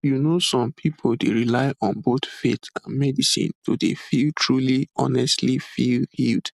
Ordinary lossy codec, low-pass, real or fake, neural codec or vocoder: none; 14.4 kHz; real; none